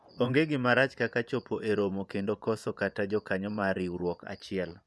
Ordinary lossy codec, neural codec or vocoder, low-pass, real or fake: none; vocoder, 24 kHz, 100 mel bands, Vocos; none; fake